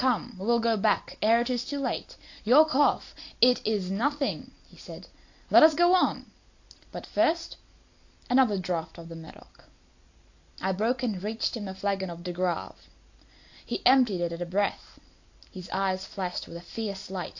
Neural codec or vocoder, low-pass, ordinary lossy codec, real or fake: none; 7.2 kHz; AAC, 48 kbps; real